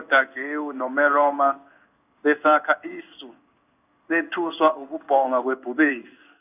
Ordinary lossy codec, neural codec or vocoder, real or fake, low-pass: none; codec, 16 kHz in and 24 kHz out, 1 kbps, XY-Tokenizer; fake; 3.6 kHz